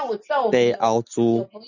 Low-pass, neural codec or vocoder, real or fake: 7.2 kHz; none; real